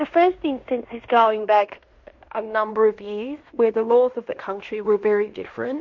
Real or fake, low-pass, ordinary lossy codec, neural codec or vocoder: fake; 7.2 kHz; MP3, 48 kbps; codec, 16 kHz in and 24 kHz out, 0.9 kbps, LongCat-Audio-Codec, fine tuned four codebook decoder